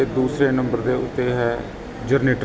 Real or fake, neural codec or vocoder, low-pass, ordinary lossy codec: real; none; none; none